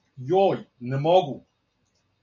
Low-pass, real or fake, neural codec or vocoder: 7.2 kHz; real; none